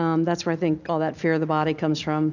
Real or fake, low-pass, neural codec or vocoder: real; 7.2 kHz; none